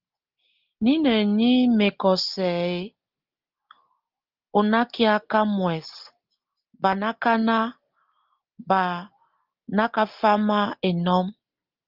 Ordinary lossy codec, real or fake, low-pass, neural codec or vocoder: Opus, 24 kbps; real; 5.4 kHz; none